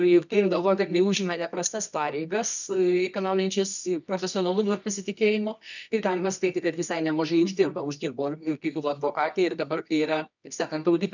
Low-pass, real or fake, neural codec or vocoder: 7.2 kHz; fake; codec, 24 kHz, 0.9 kbps, WavTokenizer, medium music audio release